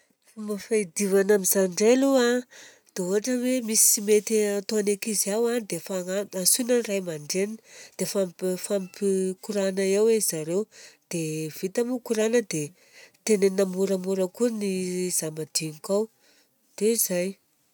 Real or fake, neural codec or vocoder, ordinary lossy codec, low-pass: real; none; none; none